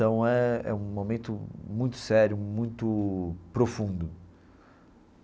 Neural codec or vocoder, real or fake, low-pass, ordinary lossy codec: none; real; none; none